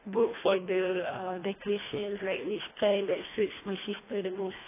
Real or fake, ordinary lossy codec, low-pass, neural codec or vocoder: fake; AAC, 16 kbps; 3.6 kHz; codec, 24 kHz, 1.5 kbps, HILCodec